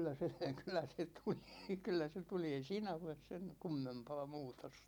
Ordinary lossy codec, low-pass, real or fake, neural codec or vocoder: none; 19.8 kHz; real; none